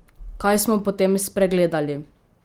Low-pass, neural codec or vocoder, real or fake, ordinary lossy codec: 19.8 kHz; none; real; Opus, 24 kbps